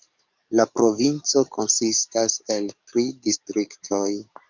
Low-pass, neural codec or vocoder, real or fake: 7.2 kHz; codec, 44.1 kHz, 7.8 kbps, DAC; fake